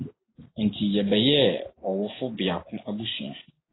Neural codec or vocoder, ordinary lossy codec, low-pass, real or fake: none; AAC, 16 kbps; 7.2 kHz; real